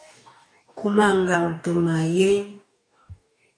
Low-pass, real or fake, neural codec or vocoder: 9.9 kHz; fake; codec, 44.1 kHz, 2.6 kbps, DAC